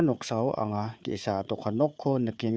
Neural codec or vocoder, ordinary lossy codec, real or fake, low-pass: codec, 16 kHz, 4 kbps, FunCodec, trained on Chinese and English, 50 frames a second; none; fake; none